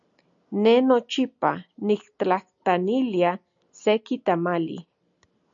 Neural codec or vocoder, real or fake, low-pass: none; real; 7.2 kHz